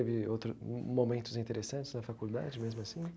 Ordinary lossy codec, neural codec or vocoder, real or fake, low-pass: none; none; real; none